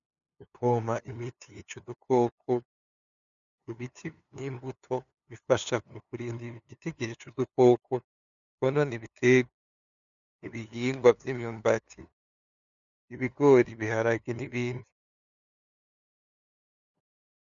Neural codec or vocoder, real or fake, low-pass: codec, 16 kHz, 2 kbps, FunCodec, trained on LibriTTS, 25 frames a second; fake; 7.2 kHz